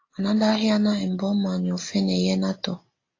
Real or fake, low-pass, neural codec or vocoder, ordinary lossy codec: real; 7.2 kHz; none; AAC, 32 kbps